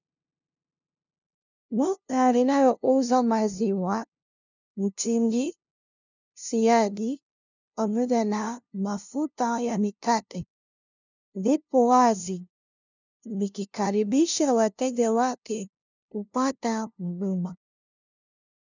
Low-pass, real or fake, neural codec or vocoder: 7.2 kHz; fake; codec, 16 kHz, 0.5 kbps, FunCodec, trained on LibriTTS, 25 frames a second